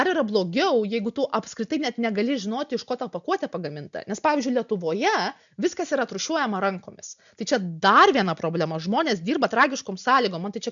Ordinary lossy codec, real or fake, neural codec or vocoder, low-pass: MP3, 96 kbps; real; none; 7.2 kHz